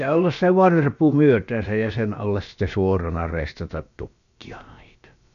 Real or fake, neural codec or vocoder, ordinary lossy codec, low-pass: fake; codec, 16 kHz, about 1 kbps, DyCAST, with the encoder's durations; none; 7.2 kHz